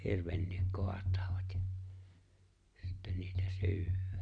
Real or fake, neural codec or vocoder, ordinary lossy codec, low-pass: real; none; none; 9.9 kHz